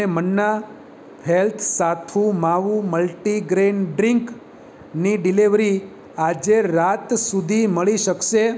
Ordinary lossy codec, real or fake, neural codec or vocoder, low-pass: none; real; none; none